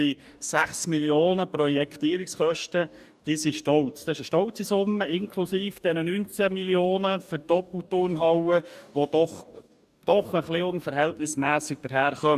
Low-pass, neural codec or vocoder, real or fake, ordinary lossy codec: 14.4 kHz; codec, 44.1 kHz, 2.6 kbps, DAC; fake; none